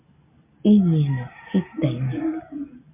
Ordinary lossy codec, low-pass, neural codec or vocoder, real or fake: MP3, 24 kbps; 3.6 kHz; none; real